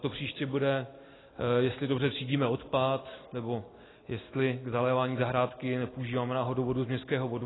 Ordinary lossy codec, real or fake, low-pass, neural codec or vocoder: AAC, 16 kbps; real; 7.2 kHz; none